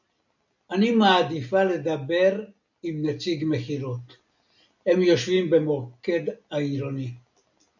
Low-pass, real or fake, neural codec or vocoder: 7.2 kHz; real; none